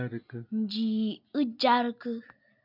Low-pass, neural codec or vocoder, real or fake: 5.4 kHz; none; real